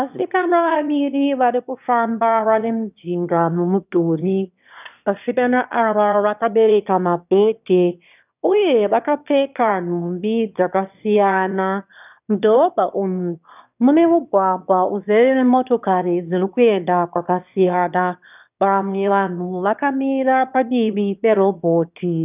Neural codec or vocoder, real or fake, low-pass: autoencoder, 22.05 kHz, a latent of 192 numbers a frame, VITS, trained on one speaker; fake; 3.6 kHz